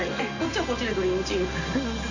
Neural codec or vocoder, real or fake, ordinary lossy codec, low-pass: none; real; none; 7.2 kHz